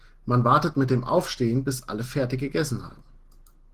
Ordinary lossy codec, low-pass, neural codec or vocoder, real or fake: Opus, 16 kbps; 14.4 kHz; none; real